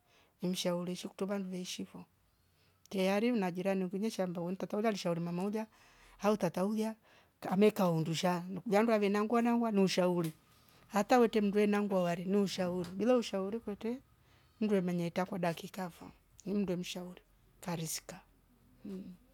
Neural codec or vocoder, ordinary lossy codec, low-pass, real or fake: none; none; 19.8 kHz; real